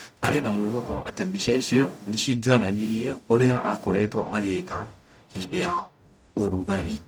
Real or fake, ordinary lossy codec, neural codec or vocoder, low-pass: fake; none; codec, 44.1 kHz, 0.9 kbps, DAC; none